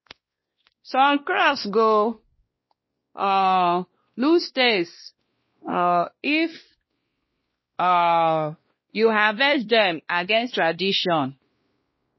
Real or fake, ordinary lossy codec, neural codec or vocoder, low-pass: fake; MP3, 24 kbps; codec, 16 kHz, 1 kbps, X-Codec, WavLM features, trained on Multilingual LibriSpeech; 7.2 kHz